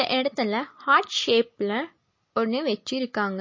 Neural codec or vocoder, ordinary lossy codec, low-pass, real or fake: none; MP3, 32 kbps; 7.2 kHz; real